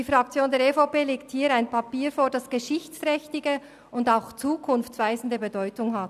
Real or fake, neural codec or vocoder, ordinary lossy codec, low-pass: real; none; none; 14.4 kHz